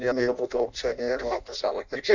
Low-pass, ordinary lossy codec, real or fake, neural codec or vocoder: 7.2 kHz; Opus, 64 kbps; fake; codec, 16 kHz in and 24 kHz out, 0.6 kbps, FireRedTTS-2 codec